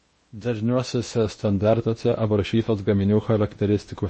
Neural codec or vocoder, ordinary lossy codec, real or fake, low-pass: codec, 16 kHz in and 24 kHz out, 0.8 kbps, FocalCodec, streaming, 65536 codes; MP3, 32 kbps; fake; 10.8 kHz